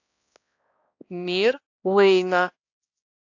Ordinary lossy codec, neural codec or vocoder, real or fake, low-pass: AAC, 48 kbps; codec, 16 kHz, 1 kbps, X-Codec, HuBERT features, trained on balanced general audio; fake; 7.2 kHz